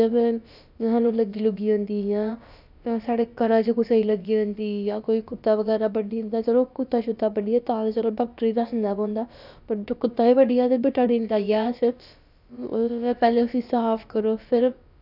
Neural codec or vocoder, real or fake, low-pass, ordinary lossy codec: codec, 16 kHz, about 1 kbps, DyCAST, with the encoder's durations; fake; 5.4 kHz; none